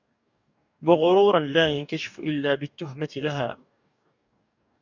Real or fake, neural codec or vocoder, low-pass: fake; codec, 44.1 kHz, 2.6 kbps, DAC; 7.2 kHz